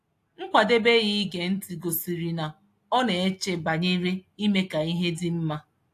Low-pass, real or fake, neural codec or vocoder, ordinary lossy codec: 14.4 kHz; real; none; AAC, 64 kbps